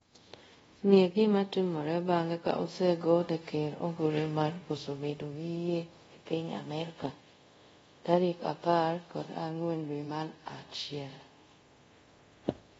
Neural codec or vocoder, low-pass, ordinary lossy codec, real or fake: codec, 24 kHz, 0.5 kbps, DualCodec; 10.8 kHz; AAC, 24 kbps; fake